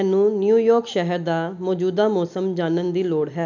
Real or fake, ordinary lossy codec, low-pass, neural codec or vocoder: real; none; 7.2 kHz; none